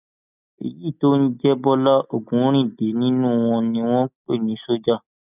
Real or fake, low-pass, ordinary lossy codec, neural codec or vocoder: real; 3.6 kHz; none; none